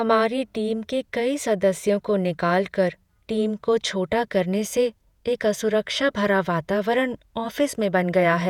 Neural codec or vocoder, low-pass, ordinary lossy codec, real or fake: vocoder, 48 kHz, 128 mel bands, Vocos; 19.8 kHz; none; fake